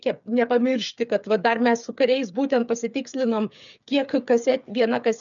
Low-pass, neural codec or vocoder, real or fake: 7.2 kHz; codec, 16 kHz, 8 kbps, FreqCodec, smaller model; fake